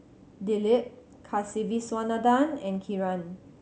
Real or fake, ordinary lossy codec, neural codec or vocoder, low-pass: real; none; none; none